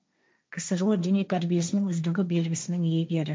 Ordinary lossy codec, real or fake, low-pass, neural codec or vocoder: none; fake; none; codec, 16 kHz, 1.1 kbps, Voila-Tokenizer